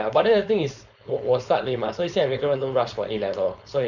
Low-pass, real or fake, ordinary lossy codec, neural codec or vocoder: 7.2 kHz; fake; none; codec, 16 kHz, 4.8 kbps, FACodec